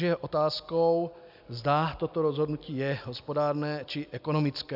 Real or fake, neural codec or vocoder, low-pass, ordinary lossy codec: real; none; 5.4 kHz; AAC, 48 kbps